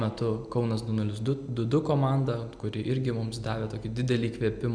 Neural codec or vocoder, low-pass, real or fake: none; 9.9 kHz; real